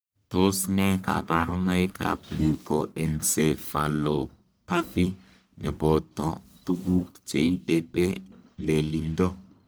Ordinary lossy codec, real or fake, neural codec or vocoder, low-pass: none; fake; codec, 44.1 kHz, 1.7 kbps, Pupu-Codec; none